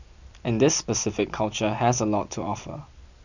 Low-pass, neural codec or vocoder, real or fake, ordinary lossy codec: 7.2 kHz; none; real; none